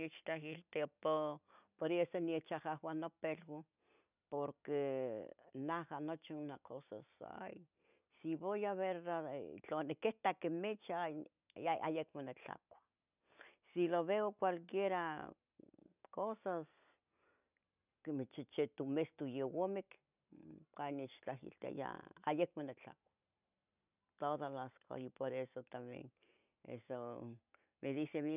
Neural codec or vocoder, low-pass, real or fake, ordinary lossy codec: none; 3.6 kHz; real; none